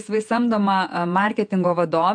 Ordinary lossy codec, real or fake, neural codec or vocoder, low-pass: MP3, 64 kbps; fake; vocoder, 48 kHz, 128 mel bands, Vocos; 9.9 kHz